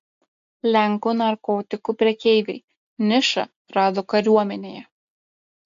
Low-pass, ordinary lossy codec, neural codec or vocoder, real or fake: 7.2 kHz; AAC, 64 kbps; none; real